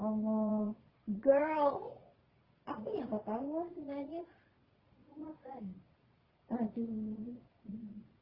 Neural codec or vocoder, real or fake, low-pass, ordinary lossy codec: vocoder, 22.05 kHz, 80 mel bands, Vocos; fake; 5.4 kHz; Opus, 24 kbps